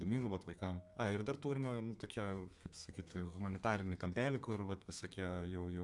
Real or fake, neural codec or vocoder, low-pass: fake; codec, 32 kHz, 1.9 kbps, SNAC; 10.8 kHz